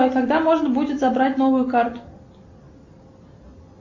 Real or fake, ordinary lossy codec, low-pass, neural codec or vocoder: real; MP3, 64 kbps; 7.2 kHz; none